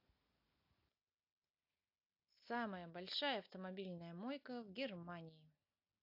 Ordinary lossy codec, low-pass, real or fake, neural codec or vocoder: AAC, 48 kbps; 5.4 kHz; real; none